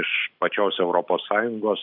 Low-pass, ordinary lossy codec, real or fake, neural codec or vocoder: 5.4 kHz; AAC, 48 kbps; real; none